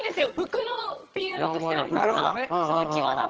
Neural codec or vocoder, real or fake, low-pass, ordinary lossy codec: vocoder, 22.05 kHz, 80 mel bands, HiFi-GAN; fake; 7.2 kHz; Opus, 16 kbps